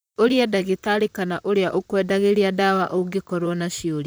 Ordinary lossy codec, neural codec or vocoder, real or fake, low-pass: none; vocoder, 44.1 kHz, 128 mel bands, Pupu-Vocoder; fake; none